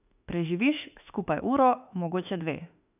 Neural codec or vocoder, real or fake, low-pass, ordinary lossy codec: autoencoder, 48 kHz, 32 numbers a frame, DAC-VAE, trained on Japanese speech; fake; 3.6 kHz; none